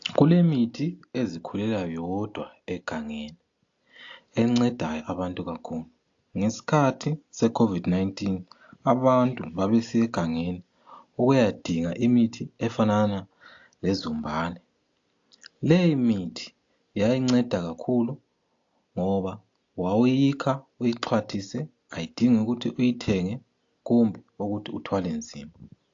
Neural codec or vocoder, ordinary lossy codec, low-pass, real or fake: none; AAC, 48 kbps; 7.2 kHz; real